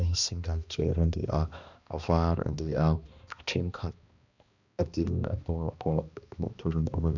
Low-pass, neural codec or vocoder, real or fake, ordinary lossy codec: 7.2 kHz; codec, 16 kHz, 1 kbps, X-Codec, HuBERT features, trained on balanced general audio; fake; none